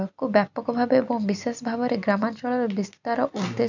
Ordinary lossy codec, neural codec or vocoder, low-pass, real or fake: none; none; 7.2 kHz; real